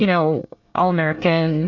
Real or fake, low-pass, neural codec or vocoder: fake; 7.2 kHz; codec, 24 kHz, 1 kbps, SNAC